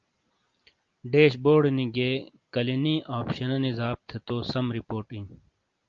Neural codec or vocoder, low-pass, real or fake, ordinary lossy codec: none; 7.2 kHz; real; Opus, 24 kbps